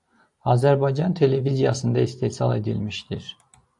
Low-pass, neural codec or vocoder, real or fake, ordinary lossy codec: 10.8 kHz; none; real; AAC, 64 kbps